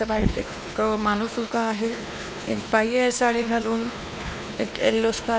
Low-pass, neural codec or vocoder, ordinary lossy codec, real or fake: none; codec, 16 kHz, 2 kbps, X-Codec, WavLM features, trained on Multilingual LibriSpeech; none; fake